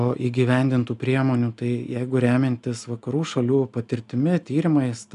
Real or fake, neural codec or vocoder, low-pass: real; none; 10.8 kHz